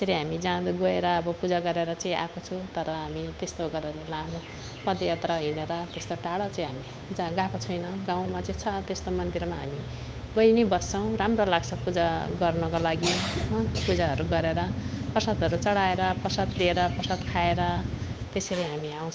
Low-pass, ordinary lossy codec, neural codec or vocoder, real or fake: none; none; codec, 16 kHz, 8 kbps, FunCodec, trained on Chinese and English, 25 frames a second; fake